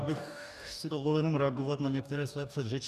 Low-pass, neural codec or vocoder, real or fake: 14.4 kHz; codec, 44.1 kHz, 2.6 kbps, DAC; fake